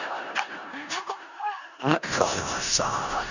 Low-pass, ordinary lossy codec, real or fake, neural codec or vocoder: 7.2 kHz; none; fake; codec, 16 kHz in and 24 kHz out, 0.4 kbps, LongCat-Audio-Codec, four codebook decoder